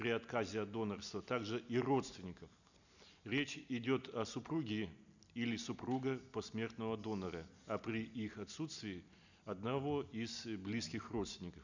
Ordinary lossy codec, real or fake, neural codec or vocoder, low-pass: none; real; none; 7.2 kHz